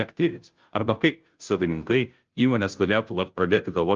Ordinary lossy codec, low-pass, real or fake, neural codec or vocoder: Opus, 24 kbps; 7.2 kHz; fake; codec, 16 kHz, 0.5 kbps, FunCodec, trained on Chinese and English, 25 frames a second